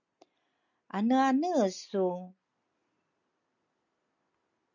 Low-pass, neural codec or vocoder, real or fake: 7.2 kHz; none; real